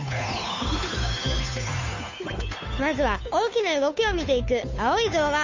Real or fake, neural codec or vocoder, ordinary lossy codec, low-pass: fake; codec, 16 kHz, 2 kbps, FunCodec, trained on Chinese and English, 25 frames a second; MP3, 64 kbps; 7.2 kHz